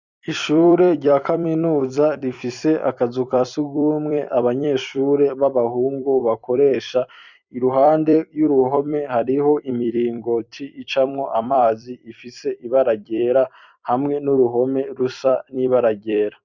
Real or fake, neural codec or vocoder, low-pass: fake; vocoder, 44.1 kHz, 128 mel bands every 256 samples, BigVGAN v2; 7.2 kHz